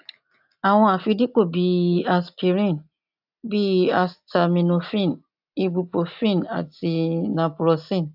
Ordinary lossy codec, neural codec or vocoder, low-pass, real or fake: AAC, 48 kbps; none; 5.4 kHz; real